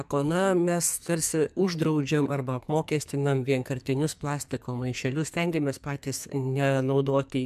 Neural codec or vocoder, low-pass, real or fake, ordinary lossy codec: codec, 44.1 kHz, 2.6 kbps, SNAC; 14.4 kHz; fake; MP3, 96 kbps